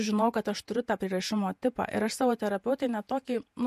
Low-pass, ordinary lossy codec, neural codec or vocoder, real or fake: 14.4 kHz; MP3, 64 kbps; vocoder, 48 kHz, 128 mel bands, Vocos; fake